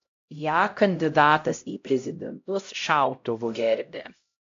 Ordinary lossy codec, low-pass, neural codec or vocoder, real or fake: AAC, 48 kbps; 7.2 kHz; codec, 16 kHz, 0.5 kbps, X-Codec, HuBERT features, trained on LibriSpeech; fake